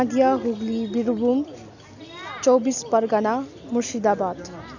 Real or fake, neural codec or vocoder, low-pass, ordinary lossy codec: real; none; 7.2 kHz; none